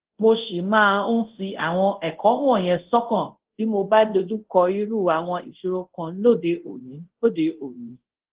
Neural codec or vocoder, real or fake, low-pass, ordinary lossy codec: codec, 24 kHz, 0.5 kbps, DualCodec; fake; 3.6 kHz; Opus, 16 kbps